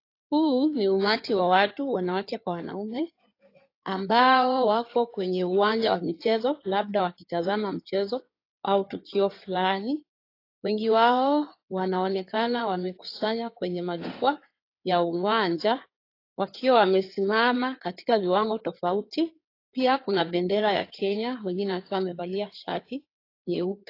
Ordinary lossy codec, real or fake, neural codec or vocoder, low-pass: AAC, 32 kbps; fake; codec, 16 kHz in and 24 kHz out, 2.2 kbps, FireRedTTS-2 codec; 5.4 kHz